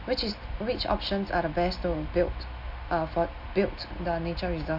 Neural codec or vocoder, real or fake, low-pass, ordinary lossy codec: none; real; 5.4 kHz; MP3, 48 kbps